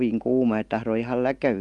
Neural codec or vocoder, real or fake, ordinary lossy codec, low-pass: none; real; Opus, 24 kbps; 10.8 kHz